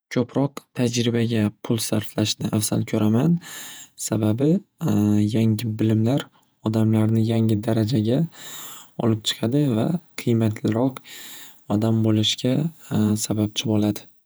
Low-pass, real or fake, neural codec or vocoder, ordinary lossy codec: none; fake; vocoder, 48 kHz, 128 mel bands, Vocos; none